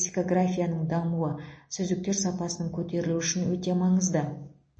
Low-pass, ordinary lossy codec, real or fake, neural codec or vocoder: 9.9 kHz; MP3, 32 kbps; real; none